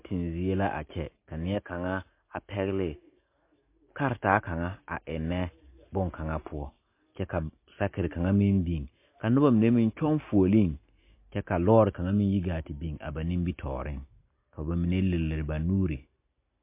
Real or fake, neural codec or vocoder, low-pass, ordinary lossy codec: real; none; 3.6 kHz; MP3, 24 kbps